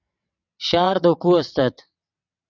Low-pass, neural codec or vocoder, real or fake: 7.2 kHz; vocoder, 22.05 kHz, 80 mel bands, WaveNeXt; fake